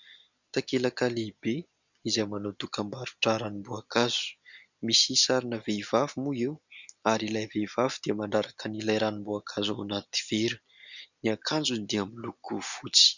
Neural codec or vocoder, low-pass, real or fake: none; 7.2 kHz; real